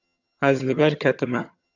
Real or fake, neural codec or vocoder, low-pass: fake; vocoder, 22.05 kHz, 80 mel bands, HiFi-GAN; 7.2 kHz